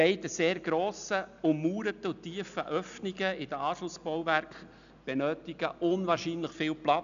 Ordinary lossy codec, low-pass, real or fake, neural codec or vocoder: none; 7.2 kHz; real; none